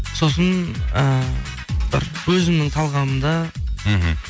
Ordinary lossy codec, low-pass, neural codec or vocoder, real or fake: none; none; none; real